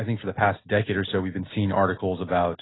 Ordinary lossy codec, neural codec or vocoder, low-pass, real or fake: AAC, 16 kbps; none; 7.2 kHz; real